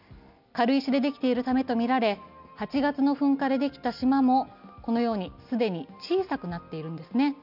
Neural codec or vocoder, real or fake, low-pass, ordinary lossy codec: none; real; 5.4 kHz; none